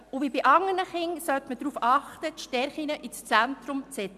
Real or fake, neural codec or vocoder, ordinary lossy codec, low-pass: real; none; AAC, 96 kbps; 14.4 kHz